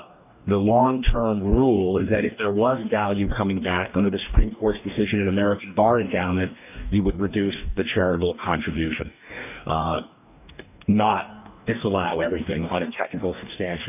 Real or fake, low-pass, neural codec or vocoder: fake; 3.6 kHz; codec, 44.1 kHz, 2.6 kbps, DAC